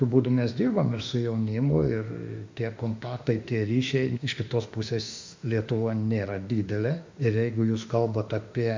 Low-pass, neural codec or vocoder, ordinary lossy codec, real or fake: 7.2 kHz; autoencoder, 48 kHz, 32 numbers a frame, DAC-VAE, trained on Japanese speech; MP3, 64 kbps; fake